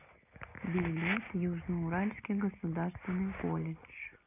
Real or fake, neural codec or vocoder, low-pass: real; none; 3.6 kHz